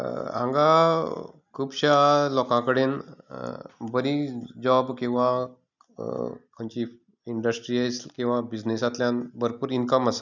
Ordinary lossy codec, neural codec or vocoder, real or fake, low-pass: none; none; real; 7.2 kHz